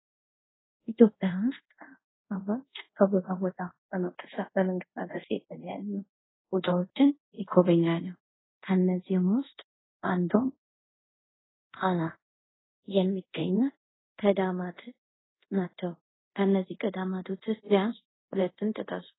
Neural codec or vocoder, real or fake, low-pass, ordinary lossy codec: codec, 24 kHz, 0.5 kbps, DualCodec; fake; 7.2 kHz; AAC, 16 kbps